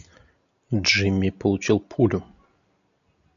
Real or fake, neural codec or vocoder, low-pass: real; none; 7.2 kHz